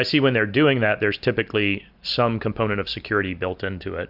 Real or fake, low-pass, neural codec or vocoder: real; 5.4 kHz; none